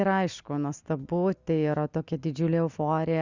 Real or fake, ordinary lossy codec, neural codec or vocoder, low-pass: real; Opus, 64 kbps; none; 7.2 kHz